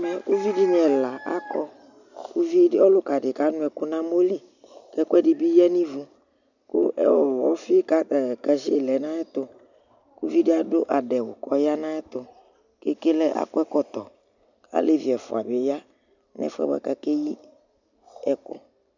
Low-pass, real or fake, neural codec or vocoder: 7.2 kHz; real; none